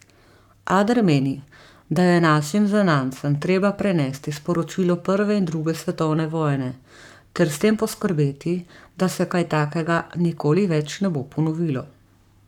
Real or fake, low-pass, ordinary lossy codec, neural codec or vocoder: fake; 19.8 kHz; none; codec, 44.1 kHz, 7.8 kbps, Pupu-Codec